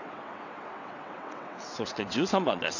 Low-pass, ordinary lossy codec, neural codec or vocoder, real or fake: 7.2 kHz; none; vocoder, 22.05 kHz, 80 mel bands, WaveNeXt; fake